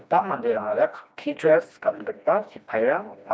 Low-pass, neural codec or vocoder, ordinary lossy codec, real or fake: none; codec, 16 kHz, 1 kbps, FreqCodec, smaller model; none; fake